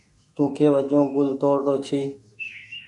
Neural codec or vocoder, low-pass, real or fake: autoencoder, 48 kHz, 32 numbers a frame, DAC-VAE, trained on Japanese speech; 10.8 kHz; fake